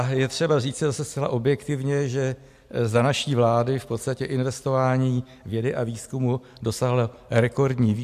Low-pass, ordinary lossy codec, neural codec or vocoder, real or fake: 14.4 kHz; AAC, 96 kbps; none; real